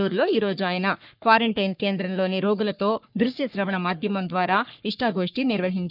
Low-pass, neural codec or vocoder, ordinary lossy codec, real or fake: 5.4 kHz; codec, 44.1 kHz, 3.4 kbps, Pupu-Codec; none; fake